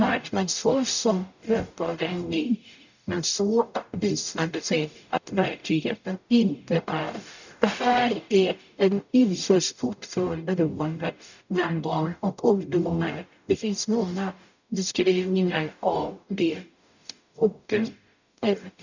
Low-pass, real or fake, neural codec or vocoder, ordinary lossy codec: 7.2 kHz; fake; codec, 44.1 kHz, 0.9 kbps, DAC; none